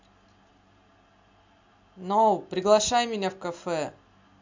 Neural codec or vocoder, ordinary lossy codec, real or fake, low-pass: none; MP3, 64 kbps; real; 7.2 kHz